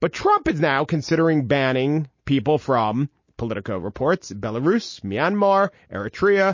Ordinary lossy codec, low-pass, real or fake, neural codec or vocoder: MP3, 32 kbps; 7.2 kHz; real; none